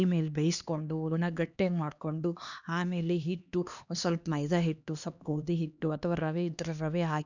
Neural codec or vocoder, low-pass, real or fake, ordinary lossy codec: codec, 16 kHz, 2 kbps, X-Codec, HuBERT features, trained on LibriSpeech; 7.2 kHz; fake; none